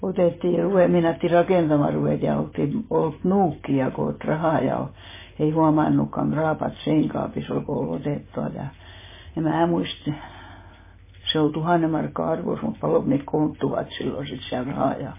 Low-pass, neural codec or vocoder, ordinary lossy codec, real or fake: 3.6 kHz; none; MP3, 16 kbps; real